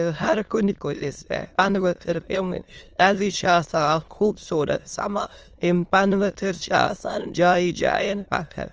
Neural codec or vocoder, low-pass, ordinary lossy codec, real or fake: autoencoder, 22.05 kHz, a latent of 192 numbers a frame, VITS, trained on many speakers; 7.2 kHz; Opus, 24 kbps; fake